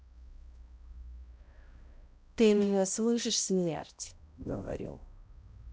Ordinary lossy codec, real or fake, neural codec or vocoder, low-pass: none; fake; codec, 16 kHz, 0.5 kbps, X-Codec, HuBERT features, trained on balanced general audio; none